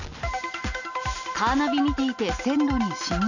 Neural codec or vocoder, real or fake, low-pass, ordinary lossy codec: none; real; 7.2 kHz; none